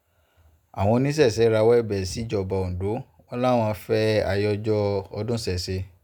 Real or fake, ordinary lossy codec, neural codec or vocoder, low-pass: real; none; none; 19.8 kHz